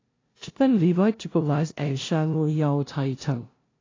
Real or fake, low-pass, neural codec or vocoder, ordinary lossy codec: fake; 7.2 kHz; codec, 16 kHz, 0.5 kbps, FunCodec, trained on LibriTTS, 25 frames a second; AAC, 32 kbps